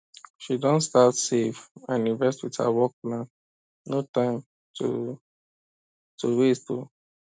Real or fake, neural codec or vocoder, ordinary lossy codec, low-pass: real; none; none; none